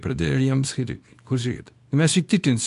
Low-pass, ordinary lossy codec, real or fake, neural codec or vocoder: 10.8 kHz; AAC, 64 kbps; fake; codec, 24 kHz, 0.9 kbps, WavTokenizer, small release